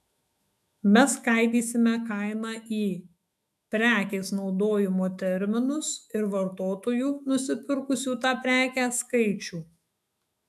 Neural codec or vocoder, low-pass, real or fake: autoencoder, 48 kHz, 128 numbers a frame, DAC-VAE, trained on Japanese speech; 14.4 kHz; fake